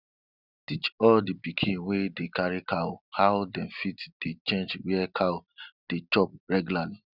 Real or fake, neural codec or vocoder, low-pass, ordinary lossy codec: real; none; 5.4 kHz; none